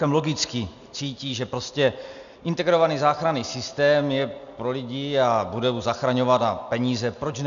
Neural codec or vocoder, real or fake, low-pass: none; real; 7.2 kHz